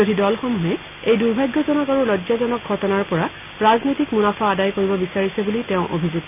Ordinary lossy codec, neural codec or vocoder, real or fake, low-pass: none; none; real; 3.6 kHz